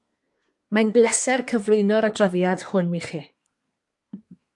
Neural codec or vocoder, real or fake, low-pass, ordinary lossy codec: codec, 24 kHz, 1 kbps, SNAC; fake; 10.8 kHz; AAC, 64 kbps